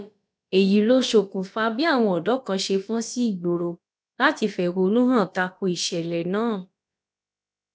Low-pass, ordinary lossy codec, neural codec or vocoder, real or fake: none; none; codec, 16 kHz, about 1 kbps, DyCAST, with the encoder's durations; fake